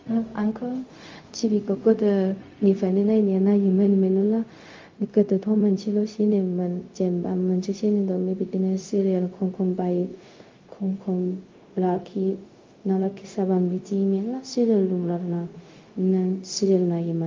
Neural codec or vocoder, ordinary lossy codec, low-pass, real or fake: codec, 16 kHz, 0.4 kbps, LongCat-Audio-Codec; Opus, 32 kbps; 7.2 kHz; fake